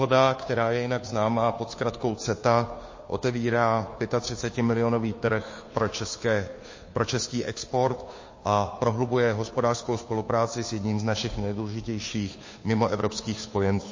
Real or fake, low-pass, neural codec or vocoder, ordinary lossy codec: fake; 7.2 kHz; codec, 16 kHz, 2 kbps, FunCodec, trained on Chinese and English, 25 frames a second; MP3, 32 kbps